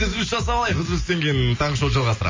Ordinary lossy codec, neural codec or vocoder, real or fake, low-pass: MP3, 32 kbps; none; real; 7.2 kHz